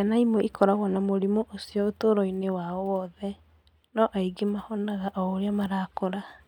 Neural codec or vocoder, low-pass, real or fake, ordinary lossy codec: none; 19.8 kHz; real; none